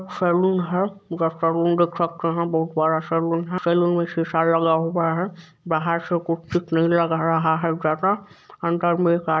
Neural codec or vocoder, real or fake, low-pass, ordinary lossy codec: none; real; none; none